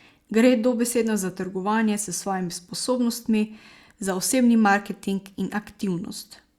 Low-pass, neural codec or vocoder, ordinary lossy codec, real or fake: 19.8 kHz; none; Opus, 64 kbps; real